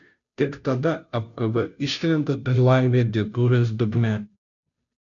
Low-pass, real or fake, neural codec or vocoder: 7.2 kHz; fake; codec, 16 kHz, 0.5 kbps, FunCodec, trained on Chinese and English, 25 frames a second